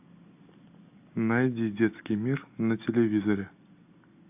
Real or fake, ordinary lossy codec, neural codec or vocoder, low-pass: real; AAC, 32 kbps; none; 3.6 kHz